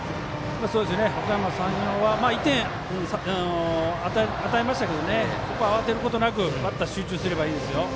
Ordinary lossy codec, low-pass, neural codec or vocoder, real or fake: none; none; none; real